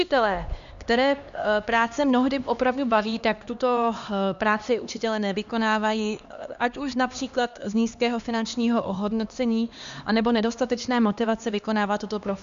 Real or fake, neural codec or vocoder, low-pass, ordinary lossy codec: fake; codec, 16 kHz, 2 kbps, X-Codec, HuBERT features, trained on LibriSpeech; 7.2 kHz; Opus, 64 kbps